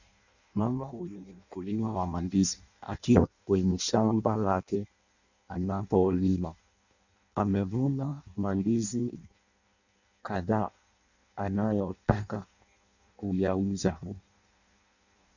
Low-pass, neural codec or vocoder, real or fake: 7.2 kHz; codec, 16 kHz in and 24 kHz out, 0.6 kbps, FireRedTTS-2 codec; fake